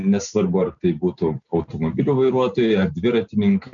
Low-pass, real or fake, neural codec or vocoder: 7.2 kHz; real; none